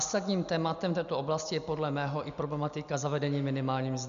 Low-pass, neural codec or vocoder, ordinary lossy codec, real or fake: 7.2 kHz; none; AAC, 96 kbps; real